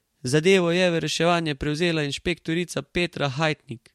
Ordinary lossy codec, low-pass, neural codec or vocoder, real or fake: MP3, 64 kbps; 19.8 kHz; vocoder, 44.1 kHz, 128 mel bands every 512 samples, BigVGAN v2; fake